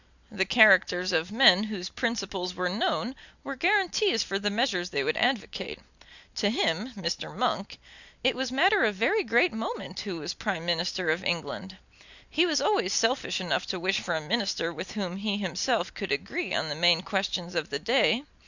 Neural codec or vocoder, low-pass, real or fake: none; 7.2 kHz; real